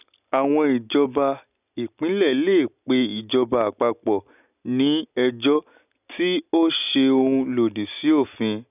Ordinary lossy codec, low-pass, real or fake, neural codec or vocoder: none; 3.6 kHz; real; none